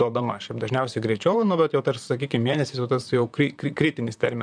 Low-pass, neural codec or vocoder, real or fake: 9.9 kHz; vocoder, 44.1 kHz, 128 mel bands, Pupu-Vocoder; fake